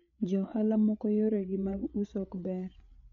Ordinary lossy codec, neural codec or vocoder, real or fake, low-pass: MP3, 48 kbps; codec, 16 kHz, 8 kbps, FreqCodec, larger model; fake; 7.2 kHz